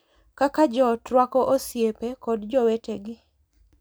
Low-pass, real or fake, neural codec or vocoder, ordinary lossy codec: none; real; none; none